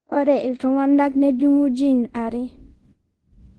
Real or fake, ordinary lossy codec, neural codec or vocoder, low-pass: fake; Opus, 16 kbps; codec, 24 kHz, 0.5 kbps, DualCodec; 10.8 kHz